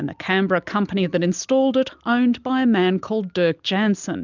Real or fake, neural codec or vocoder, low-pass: real; none; 7.2 kHz